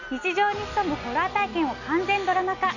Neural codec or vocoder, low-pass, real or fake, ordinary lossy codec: none; 7.2 kHz; real; none